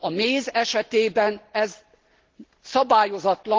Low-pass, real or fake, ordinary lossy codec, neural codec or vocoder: 7.2 kHz; real; Opus, 16 kbps; none